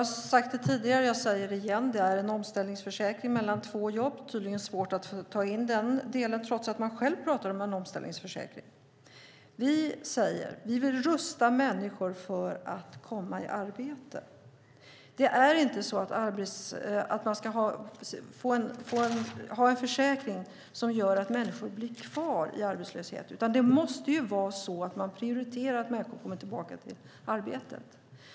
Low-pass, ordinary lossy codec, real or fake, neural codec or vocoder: none; none; real; none